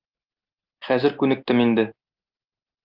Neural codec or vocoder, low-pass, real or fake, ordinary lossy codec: none; 5.4 kHz; real; Opus, 32 kbps